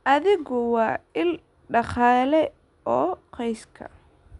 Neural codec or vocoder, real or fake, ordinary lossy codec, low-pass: none; real; none; 10.8 kHz